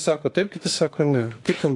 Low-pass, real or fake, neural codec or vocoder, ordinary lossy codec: 10.8 kHz; fake; autoencoder, 48 kHz, 32 numbers a frame, DAC-VAE, trained on Japanese speech; AAC, 48 kbps